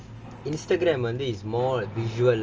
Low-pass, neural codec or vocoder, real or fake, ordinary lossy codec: 7.2 kHz; none; real; Opus, 24 kbps